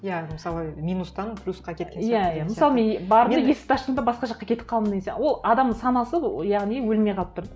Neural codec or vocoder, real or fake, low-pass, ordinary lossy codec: none; real; none; none